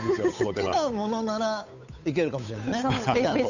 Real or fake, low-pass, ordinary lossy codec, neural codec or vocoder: fake; 7.2 kHz; none; codec, 16 kHz, 8 kbps, FunCodec, trained on Chinese and English, 25 frames a second